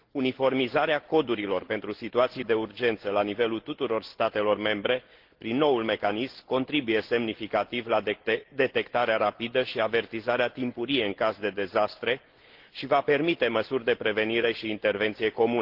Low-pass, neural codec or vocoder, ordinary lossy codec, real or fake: 5.4 kHz; none; Opus, 16 kbps; real